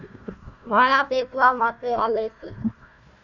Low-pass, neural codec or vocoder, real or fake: 7.2 kHz; codec, 16 kHz, 1 kbps, FunCodec, trained on Chinese and English, 50 frames a second; fake